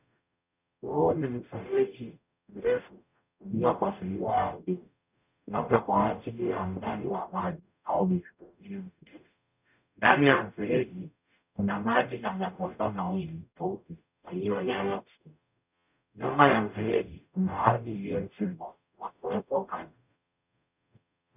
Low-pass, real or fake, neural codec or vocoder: 3.6 kHz; fake; codec, 44.1 kHz, 0.9 kbps, DAC